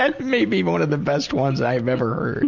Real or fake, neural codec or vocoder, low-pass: real; none; 7.2 kHz